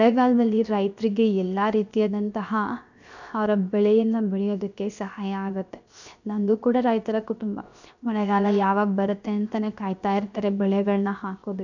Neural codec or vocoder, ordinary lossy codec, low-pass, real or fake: codec, 16 kHz, 0.7 kbps, FocalCodec; none; 7.2 kHz; fake